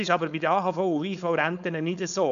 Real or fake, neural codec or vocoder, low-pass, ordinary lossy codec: fake; codec, 16 kHz, 4.8 kbps, FACodec; 7.2 kHz; none